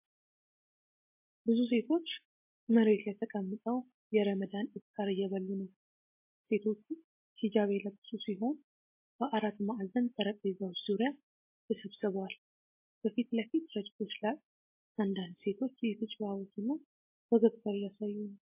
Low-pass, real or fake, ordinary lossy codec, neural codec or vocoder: 3.6 kHz; real; MP3, 24 kbps; none